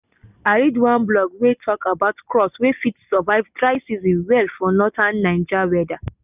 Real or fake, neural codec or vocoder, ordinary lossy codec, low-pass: real; none; none; 3.6 kHz